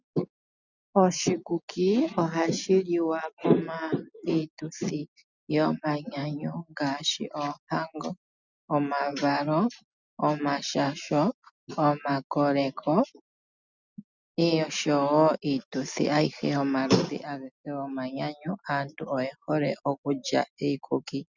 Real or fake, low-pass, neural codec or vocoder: real; 7.2 kHz; none